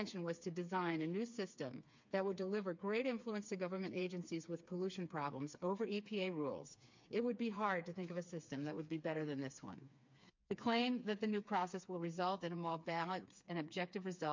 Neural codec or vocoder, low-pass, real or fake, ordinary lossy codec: codec, 16 kHz, 4 kbps, FreqCodec, smaller model; 7.2 kHz; fake; MP3, 48 kbps